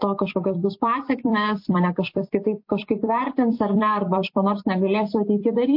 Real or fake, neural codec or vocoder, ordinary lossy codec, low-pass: fake; vocoder, 44.1 kHz, 128 mel bands every 256 samples, BigVGAN v2; MP3, 32 kbps; 5.4 kHz